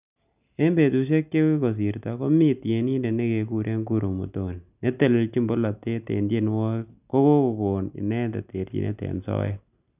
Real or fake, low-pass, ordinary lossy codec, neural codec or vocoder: real; 3.6 kHz; none; none